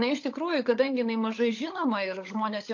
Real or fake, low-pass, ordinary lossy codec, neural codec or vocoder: fake; 7.2 kHz; AAC, 48 kbps; codec, 16 kHz, 16 kbps, FunCodec, trained on LibriTTS, 50 frames a second